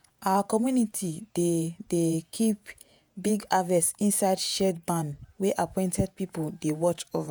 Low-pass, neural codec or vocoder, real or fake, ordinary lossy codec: none; vocoder, 48 kHz, 128 mel bands, Vocos; fake; none